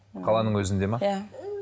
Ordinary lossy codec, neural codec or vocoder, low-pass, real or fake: none; none; none; real